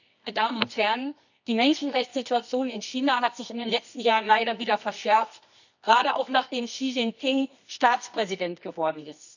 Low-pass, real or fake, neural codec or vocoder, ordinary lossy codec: 7.2 kHz; fake; codec, 24 kHz, 0.9 kbps, WavTokenizer, medium music audio release; none